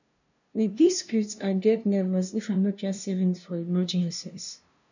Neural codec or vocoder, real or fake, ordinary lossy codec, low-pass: codec, 16 kHz, 0.5 kbps, FunCodec, trained on LibriTTS, 25 frames a second; fake; none; 7.2 kHz